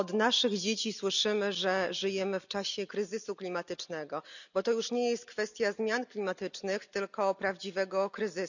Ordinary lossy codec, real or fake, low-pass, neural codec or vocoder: none; real; 7.2 kHz; none